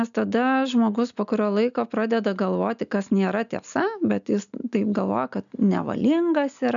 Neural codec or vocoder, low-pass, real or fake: none; 7.2 kHz; real